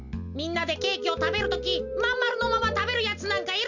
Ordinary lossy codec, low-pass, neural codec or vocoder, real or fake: none; 7.2 kHz; none; real